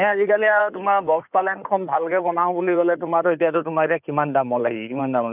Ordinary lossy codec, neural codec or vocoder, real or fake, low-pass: none; codec, 16 kHz in and 24 kHz out, 2.2 kbps, FireRedTTS-2 codec; fake; 3.6 kHz